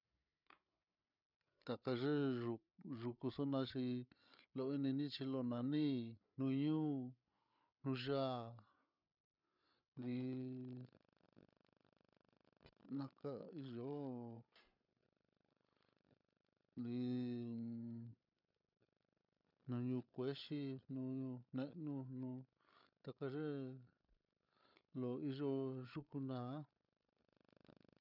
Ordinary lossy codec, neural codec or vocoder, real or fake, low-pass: none; codec, 16 kHz, 8 kbps, FreqCodec, larger model; fake; 5.4 kHz